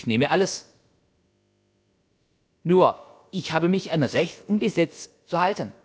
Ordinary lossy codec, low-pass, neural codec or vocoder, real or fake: none; none; codec, 16 kHz, about 1 kbps, DyCAST, with the encoder's durations; fake